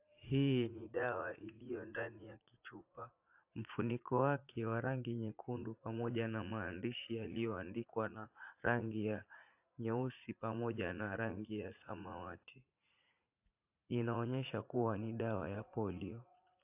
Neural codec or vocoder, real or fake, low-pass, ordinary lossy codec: vocoder, 44.1 kHz, 80 mel bands, Vocos; fake; 3.6 kHz; AAC, 32 kbps